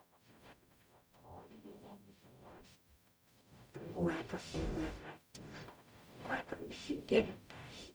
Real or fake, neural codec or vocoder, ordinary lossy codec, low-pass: fake; codec, 44.1 kHz, 0.9 kbps, DAC; none; none